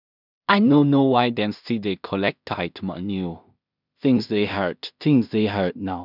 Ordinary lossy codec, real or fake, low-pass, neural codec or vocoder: none; fake; 5.4 kHz; codec, 16 kHz in and 24 kHz out, 0.4 kbps, LongCat-Audio-Codec, two codebook decoder